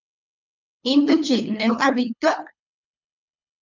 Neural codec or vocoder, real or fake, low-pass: codec, 24 kHz, 3 kbps, HILCodec; fake; 7.2 kHz